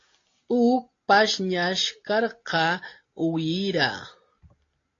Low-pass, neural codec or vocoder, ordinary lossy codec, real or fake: 7.2 kHz; none; AAC, 32 kbps; real